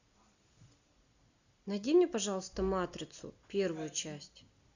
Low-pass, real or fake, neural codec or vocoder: 7.2 kHz; real; none